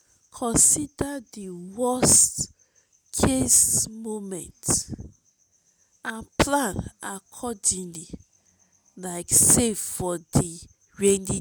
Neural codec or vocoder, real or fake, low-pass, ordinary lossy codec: none; real; none; none